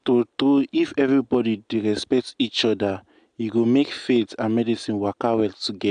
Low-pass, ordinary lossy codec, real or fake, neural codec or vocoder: 9.9 kHz; AAC, 96 kbps; real; none